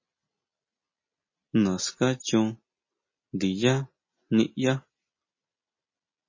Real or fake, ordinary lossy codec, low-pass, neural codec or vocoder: real; MP3, 32 kbps; 7.2 kHz; none